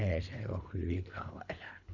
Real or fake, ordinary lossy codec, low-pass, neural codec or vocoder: fake; none; 7.2 kHz; codec, 24 kHz, 3 kbps, HILCodec